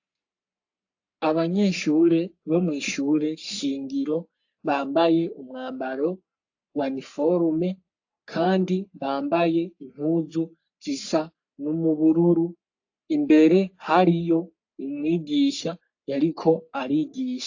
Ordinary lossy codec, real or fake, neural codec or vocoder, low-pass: AAC, 48 kbps; fake; codec, 44.1 kHz, 3.4 kbps, Pupu-Codec; 7.2 kHz